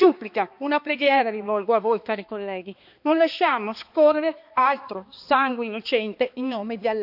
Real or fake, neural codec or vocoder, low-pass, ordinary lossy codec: fake; codec, 16 kHz, 2 kbps, X-Codec, HuBERT features, trained on balanced general audio; 5.4 kHz; none